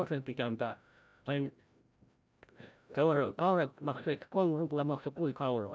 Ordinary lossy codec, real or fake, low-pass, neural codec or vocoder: none; fake; none; codec, 16 kHz, 0.5 kbps, FreqCodec, larger model